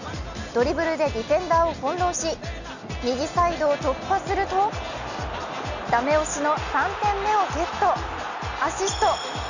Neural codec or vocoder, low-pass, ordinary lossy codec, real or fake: none; 7.2 kHz; none; real